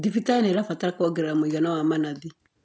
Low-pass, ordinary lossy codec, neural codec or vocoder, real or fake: none; none; none; real